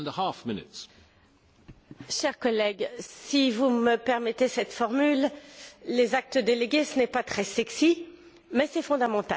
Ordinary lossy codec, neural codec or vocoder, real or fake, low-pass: none; none; real; none